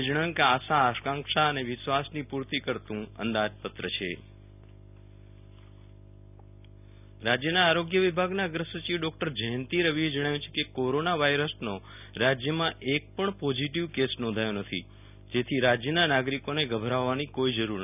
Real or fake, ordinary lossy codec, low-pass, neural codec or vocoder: real; none; 3.6 kHz; none